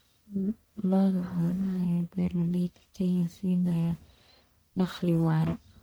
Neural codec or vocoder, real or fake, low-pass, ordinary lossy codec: codec, 44.1 kHz, 1.7 kbps, Pupu-Codec; fake; none; none